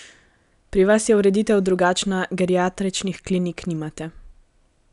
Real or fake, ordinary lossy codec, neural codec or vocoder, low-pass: real; none; none; 10.8 kHz